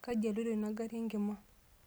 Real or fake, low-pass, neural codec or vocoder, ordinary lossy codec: real; none; none; none